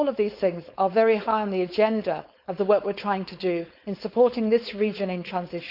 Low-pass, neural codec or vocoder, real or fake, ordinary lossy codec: 5.4 kHz; codec, 16 kHz, 4.8 kbps, FACodec; fake; none